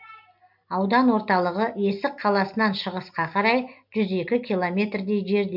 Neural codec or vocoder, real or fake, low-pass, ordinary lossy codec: none; real; 5.4 kHz; MP3, 48 kbps